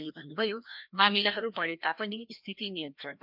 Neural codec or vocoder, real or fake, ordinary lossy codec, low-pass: codec, 16 kHz, 1 kbps, FreqCodec, larger model; fake; MP3, 48 kbps; 5.4 kHz